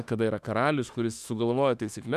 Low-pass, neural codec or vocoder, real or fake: 14.4 kHz; autoencoder, 48 kHz, 32 numbers a frame, DAC-VAE, trained on Japanese speech; fake